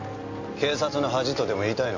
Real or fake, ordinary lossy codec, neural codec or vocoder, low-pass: real; AAC, 48 kbps; none; 7.2 kHz